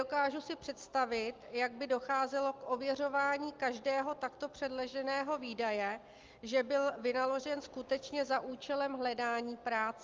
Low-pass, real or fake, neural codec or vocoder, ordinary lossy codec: 7.2 kHz; real; none; Opus, 24 kbps